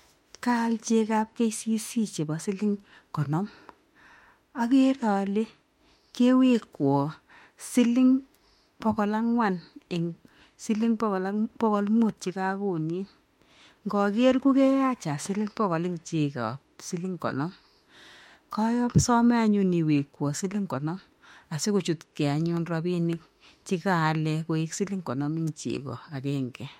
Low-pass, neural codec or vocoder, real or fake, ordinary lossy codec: 19.8 kHz; autoencoder, 48 kHz, 32 numbers a frame, DAC-VAE, trained on Japanese speech; fake; MP3, 64 kbps